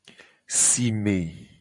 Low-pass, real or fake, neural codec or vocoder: 10.8 kHz; fake; vocoder, 44.1 kHz, 128 mel bands every 512 samples, BigVGAN v2